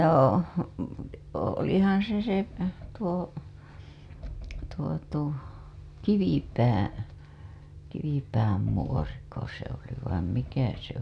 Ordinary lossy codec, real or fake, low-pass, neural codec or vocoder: none; real; none; none